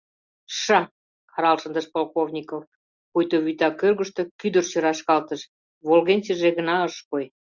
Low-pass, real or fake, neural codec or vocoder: 7.2 kHz; real; none